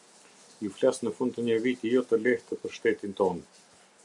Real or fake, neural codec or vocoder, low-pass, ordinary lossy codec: real; none; 10.8 kHz; MP3, 96 kbps